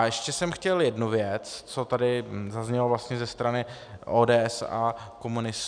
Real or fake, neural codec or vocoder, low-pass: real; none; 9.9 kHz